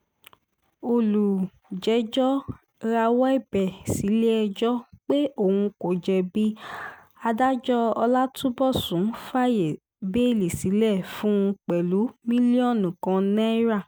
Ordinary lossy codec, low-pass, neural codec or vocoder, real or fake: none; none; none; real